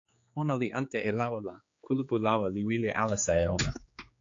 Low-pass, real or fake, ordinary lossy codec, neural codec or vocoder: 7.2 kHz; fake; AAC, 48 kbps; codec, 16 kHz, 4 kbps, X-Codec, HuBERT features, trained on general audio